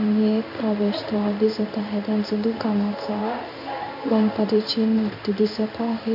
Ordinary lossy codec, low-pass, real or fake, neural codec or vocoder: none; 5.4 kHz; fake; codec, 16 kHz in and 24 kHz out, 1 kbps, XY-Tokenizer